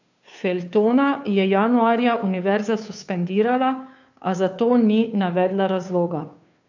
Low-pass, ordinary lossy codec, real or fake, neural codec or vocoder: 7.2 kHz; none; fake; codec, 16 kHz, 2 kbps, FunCodec, trained on Chinese and English, 25 frames a second